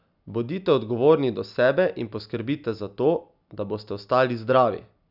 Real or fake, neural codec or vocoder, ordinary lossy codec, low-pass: real; none; none; 5.4 kHz